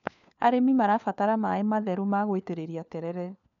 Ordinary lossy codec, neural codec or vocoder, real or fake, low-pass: none; codec, 16 kHz, 4 kbps, FunCodec, trained on LibriTTS, 50 frames a second; fake; 7.2 kHz